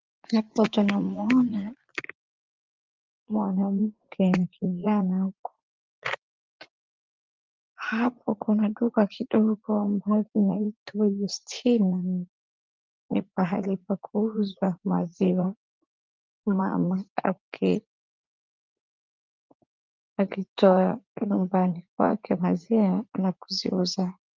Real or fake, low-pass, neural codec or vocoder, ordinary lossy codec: fake; 7.2 kHz; vocoder, 44.1 kHz, 80 mel bands, Vocos; Opus, 24 kbps